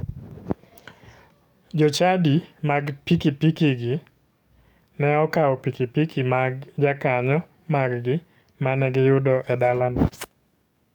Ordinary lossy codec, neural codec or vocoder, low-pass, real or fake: none; codec, 44.1 kHz, 7.8 kbps, DAC; 19.8 kHz; fake